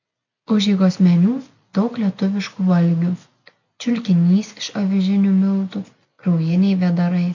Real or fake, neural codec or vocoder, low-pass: real; none; 7.2 kHz